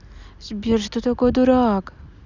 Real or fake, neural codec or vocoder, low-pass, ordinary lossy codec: real; none; 7.2 kHz; none